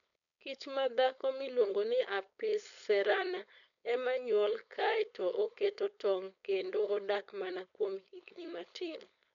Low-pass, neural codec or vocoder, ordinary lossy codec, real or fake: 7.2 kHz; codec, 16 kHz, 4.8 kbps, FACodec; MP3, 96 kbps; fake